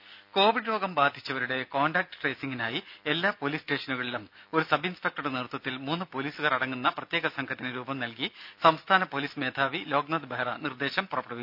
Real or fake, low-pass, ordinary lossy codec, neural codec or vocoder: real; 5.4 kHz; none; none